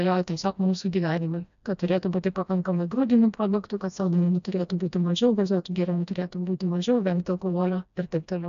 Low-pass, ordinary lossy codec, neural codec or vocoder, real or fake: 7.2 kHz; AAC, 96 kbps; codec, 16 kHz, 1 kbps, FreqCodec, smaller model; fake